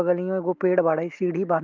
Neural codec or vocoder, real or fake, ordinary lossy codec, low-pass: none; real; Opus, 24 kbps; 7.2 kHz